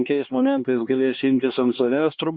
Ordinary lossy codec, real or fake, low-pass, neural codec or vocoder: Opus, 64 kbps; fake; 7.2 kHz; codec, 16 kHz, 2 kbps, X-Codec, HuBERT features, trained on LibriSpeech